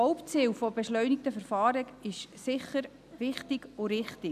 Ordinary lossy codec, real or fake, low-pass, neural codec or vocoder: none; real; 14.4 kHz; none